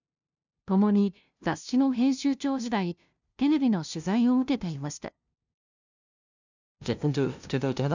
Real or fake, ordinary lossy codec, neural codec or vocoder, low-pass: fake; none; codec, 16 kHz, 0.5 kbps, FunCodec, trained on LibriTTS, 25 frames a second; 7.2 kHz